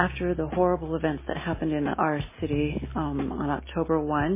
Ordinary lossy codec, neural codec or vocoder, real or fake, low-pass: MP3, 16 kbps; none; real; 3.6 kHz